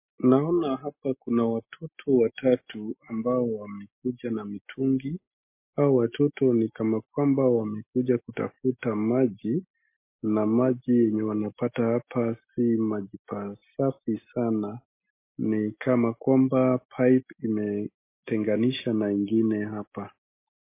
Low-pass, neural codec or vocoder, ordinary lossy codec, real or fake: 3.6 kHz; none; MP3, 24 kbps; real